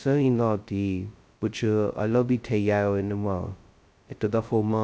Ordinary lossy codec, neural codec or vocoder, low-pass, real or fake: none; codec, 16 kHz, 0.2 kbps, FocalCodec; none; fake